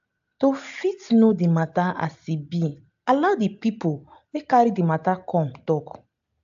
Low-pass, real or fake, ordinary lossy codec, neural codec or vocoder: 7.2 kHz; real; AAC, 64 kbps; none